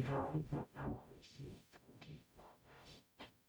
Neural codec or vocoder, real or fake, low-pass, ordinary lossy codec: codec, 44.1 kHz, 0.9 kbps, DAC; fake; none; none